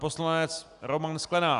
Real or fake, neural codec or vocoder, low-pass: real; none; 10.8 kHz